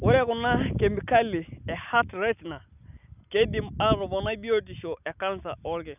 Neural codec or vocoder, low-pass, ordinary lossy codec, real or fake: none; 3.6 kHz; none; real